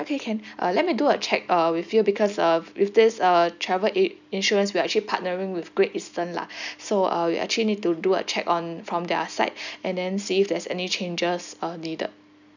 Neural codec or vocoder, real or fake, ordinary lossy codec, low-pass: none; real; none; 7.2 kHz